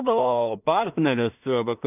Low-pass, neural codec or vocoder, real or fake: 3.6 kHz; codec, 16 kHz in and 24 kHz out, 0.4 kbps, LongCat-Audio-Codec, two codebook decoder; fake